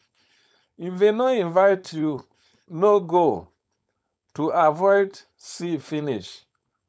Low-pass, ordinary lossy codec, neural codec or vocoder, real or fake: none; none; codec, 16 kHz, 4.8 kbps, FACodec; fake